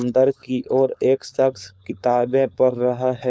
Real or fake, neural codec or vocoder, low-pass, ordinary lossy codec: fake; codec, 16 kHz, 4.8 kbps, FACodec; none; none